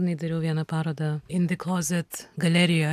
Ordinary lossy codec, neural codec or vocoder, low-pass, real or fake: AAC, 96 kbps; none; 14.4 kHz; real